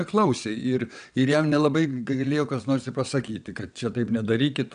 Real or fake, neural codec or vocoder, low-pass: fake; vocoder, 22.05 kHz, 80 mel bands, WaveNeXt; 9.9 kHz